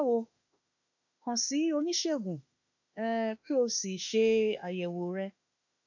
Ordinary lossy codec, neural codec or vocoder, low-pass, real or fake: none; autoencoder, 48 kHz, 32 numbers a frame, DAC-VAE, trained on Japanese speech; 7.2 kHz; fake